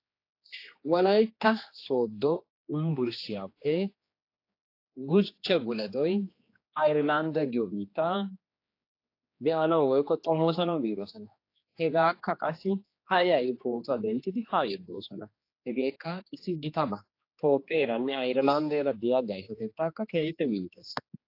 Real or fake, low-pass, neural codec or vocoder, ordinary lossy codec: fake; 5.4 kHz; codec, 16 kHz, 2 kbps, X-Codec, HuBERT features, trained on general audio; AAC, 32 kbps